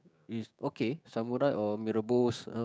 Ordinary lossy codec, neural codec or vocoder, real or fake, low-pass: none; codec, 16 kHz, 6 kbps, DAC; fake; none